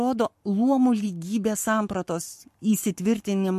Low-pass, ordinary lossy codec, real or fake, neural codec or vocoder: 14.4 kHz; MP3, 64 kbps; fake; codec, 44.1 kHz, 7.8 kbps, Pupu-Codec